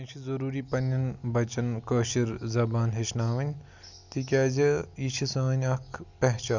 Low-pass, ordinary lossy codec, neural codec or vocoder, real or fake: 7.2 kHz; none; none; real